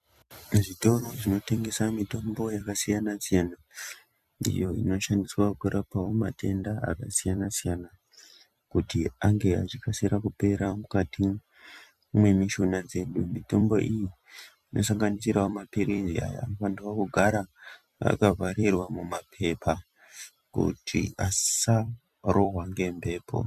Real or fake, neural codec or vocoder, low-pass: fake; vocoder, 44.1 kHz, 128 mel bands every 256 samples, BigVGAN v2; 14.4 kHz